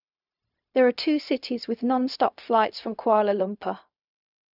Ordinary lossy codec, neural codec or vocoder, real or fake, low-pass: none; codec, 16 kHz, 0.4 kbps, LongCat-Audio-Codec; fake; 5.4 kHz